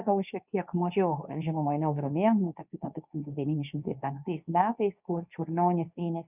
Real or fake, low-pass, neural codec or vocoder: fake; 3.6 kHz; codec, 24 kHz, 0.9 kbps, WavTokenizer, medium speech release version 2